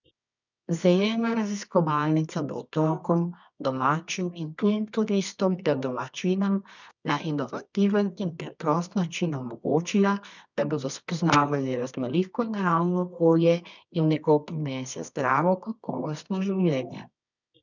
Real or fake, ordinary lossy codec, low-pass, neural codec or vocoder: fake; none; 7.2 kHz; codec, 24 kHz, 0.9 kbps, WavTokenizer, medium music audio release